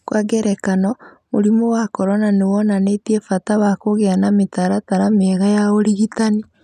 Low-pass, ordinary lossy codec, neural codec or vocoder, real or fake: 14.4 kHz; none; none; real